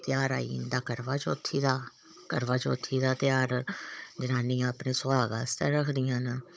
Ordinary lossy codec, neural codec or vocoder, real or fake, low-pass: none; codec, 16 kHz, 16 kbps, FunCodec, trained on Chinese and English, 50 frames a second; fake; none